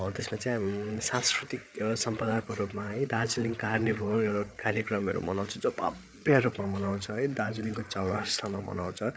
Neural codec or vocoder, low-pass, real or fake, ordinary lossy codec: codec, 16 kHz, 8 kbps, FreqCodec, larger model; none; fake; none